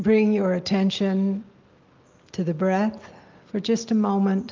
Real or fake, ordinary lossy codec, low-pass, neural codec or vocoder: real; Opus, 32 kbps; 7.2 kHz; none